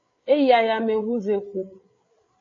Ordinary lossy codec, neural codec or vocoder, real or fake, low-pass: AAC, 32 kbps; codec, 16 kHz, 8 kbps, FreqCodec, larger model; fake; 7.2 kHz